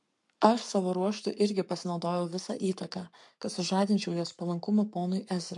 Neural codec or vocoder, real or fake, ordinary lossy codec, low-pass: codec, 44.1 kHz, 7.8 kbps, Pupu-Codec; fake; MP3, 64 kbps; 10.8 kHz